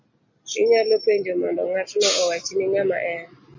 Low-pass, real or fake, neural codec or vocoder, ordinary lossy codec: 7.2 kHz; real; none; MP3, 32 kbps